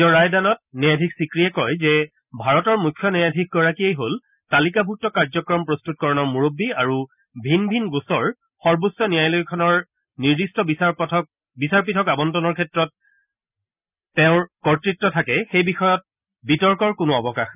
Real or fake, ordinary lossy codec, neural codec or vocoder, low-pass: real; none; none; 3.6 kHz